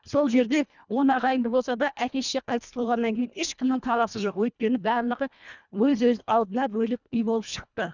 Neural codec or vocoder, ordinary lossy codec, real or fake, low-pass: codec, 24 kHz, 1.5 kbps, HILCodec; none; fake; 7.2 kHz